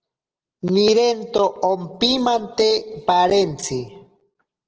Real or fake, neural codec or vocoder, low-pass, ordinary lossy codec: real; none; 7.2 kHz; Opus, 16 kbps